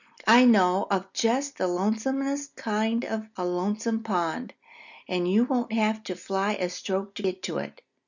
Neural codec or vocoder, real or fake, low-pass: none; real; 7.2 kHz